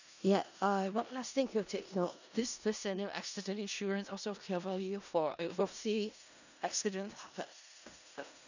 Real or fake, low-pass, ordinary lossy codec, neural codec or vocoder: fake; 7.2 kHz; none; codec, 16 kHz in and 24 kHz out, 0.4 kbps, LongCat-Audio-Codec, four codebook decoder